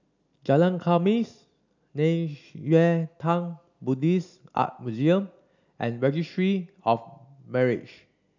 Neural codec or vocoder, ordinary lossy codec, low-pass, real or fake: none; none; 7.2 kHz; real